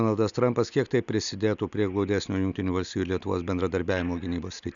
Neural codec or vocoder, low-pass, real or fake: none; 7.2 kHz; real